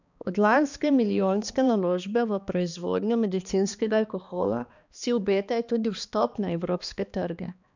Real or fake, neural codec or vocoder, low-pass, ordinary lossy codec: fake; codec, 16 kHz, 2 kbps, X-Codec, HuBERT features, trained on balanced general audio; 7.2 kHz; none